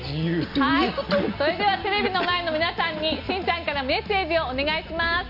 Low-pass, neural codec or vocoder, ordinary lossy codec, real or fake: 5.4 kHz; none; none; real